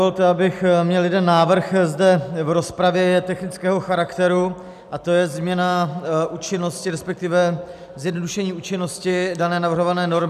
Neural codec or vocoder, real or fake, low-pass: none; real; 14.4 kHz